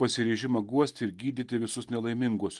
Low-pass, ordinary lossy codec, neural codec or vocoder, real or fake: 10.8 kHz; Opus, 24 kbps; none; real